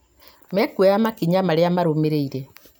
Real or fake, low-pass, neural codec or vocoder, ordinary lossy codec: real; none; none; none